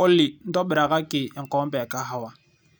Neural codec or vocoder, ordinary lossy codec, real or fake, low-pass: none; none; real; none